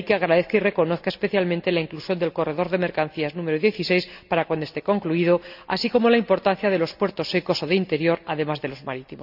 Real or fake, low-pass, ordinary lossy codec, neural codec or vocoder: real; 5.4 kHz; none; none